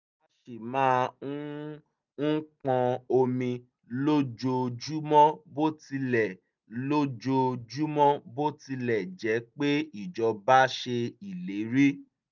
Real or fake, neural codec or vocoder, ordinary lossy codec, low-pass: real; none; none; 7.2 kHz